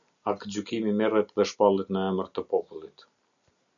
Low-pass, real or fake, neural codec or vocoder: 7.2 kHz; real; none